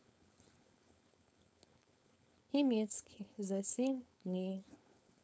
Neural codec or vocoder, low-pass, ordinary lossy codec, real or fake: codec, 16 kHz, 4.8 kbps, FACodec; none; none; fake